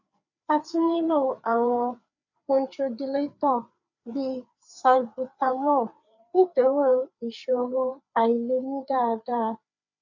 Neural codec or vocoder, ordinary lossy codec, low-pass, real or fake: codec, 16 kHz, 4 kbps, FreqCodec, larger model; none; 7.2 kHz; fake